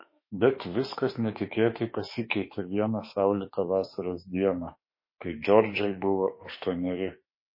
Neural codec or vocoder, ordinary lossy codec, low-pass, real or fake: autoencoder, 48 kHz, 32 numbers a frame, DAC-VAE, trained on Japanese speech; MP3, 24 kbps; 5.4 kHz; fake